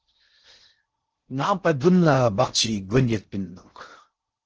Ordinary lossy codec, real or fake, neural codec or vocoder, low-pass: Opus, 16 kbps; fake; codec, 16 kHz in and 24 kHz out, 0.6 kbps, FocalCodec, streaming, 4096 codes; 7.2 kHz